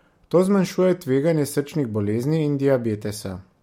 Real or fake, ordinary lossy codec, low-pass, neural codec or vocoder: real; MP3, 64 kbps; 19.8 kHz; none